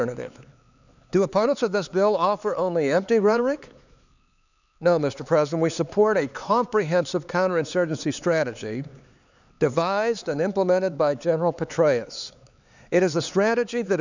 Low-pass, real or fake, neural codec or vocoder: 7.2 kHz; fake; codec, 16 kHz, 4 kbps, X-Codec, HuBERT features, trained on LibriSpeech